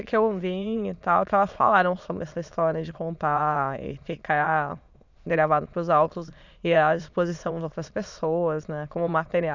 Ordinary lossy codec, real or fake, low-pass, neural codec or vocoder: none; fake; 7.2 kHz; autoencoder, 22.05 kHz, a latent of 192 numbers a frame, VITS, trained on many speakers